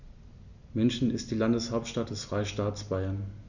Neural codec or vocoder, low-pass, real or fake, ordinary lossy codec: none; 7.2 kHz; real; none